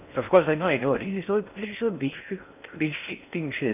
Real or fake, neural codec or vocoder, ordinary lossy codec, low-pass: fake; codec, 16 kHz in and 24 kHz out, 0.6 kbps, FocalCodec, streaming, 4096 codes; none; 3.6 kHz